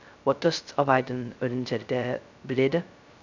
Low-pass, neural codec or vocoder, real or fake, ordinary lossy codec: 7.2 kHz; codec, 16 kHz, 0.2 kbps, FocalCodec; fake; none